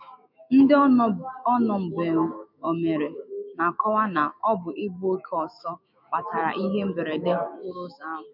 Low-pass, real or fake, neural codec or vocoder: 5.4 kHz; fake; autoencoder, 48 kHz, 128 numbers a frame, DAC-VAE, trained on Japanese speech